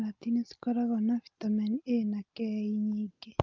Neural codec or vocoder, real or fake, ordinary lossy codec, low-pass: none; real; Opus, 32 kbps; 7.2 kHz